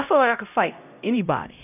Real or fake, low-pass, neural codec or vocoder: fake; 3.6 kHz; codec, 16 kHz, 0.5 kbps, X-Codec, HuBERT features, trained on LibriSpeech